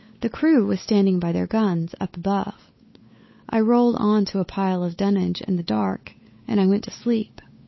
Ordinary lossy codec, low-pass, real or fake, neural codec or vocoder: MP3, 24 kbps; 7.2 kHz; fake; codec, 16 kHz, 8 kbps, FunCodec, trained on Chinese and English, 25 frames a second